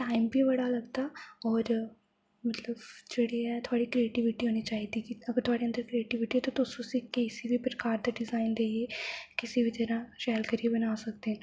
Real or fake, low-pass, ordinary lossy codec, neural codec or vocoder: real; none; none; none